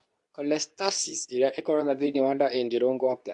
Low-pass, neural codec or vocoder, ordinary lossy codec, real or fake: 10.8 kHz; codec, 24 kHz, 0.9 kbps, WavTokenizer, medium speech release version 2; AAC, 64 kbps; fake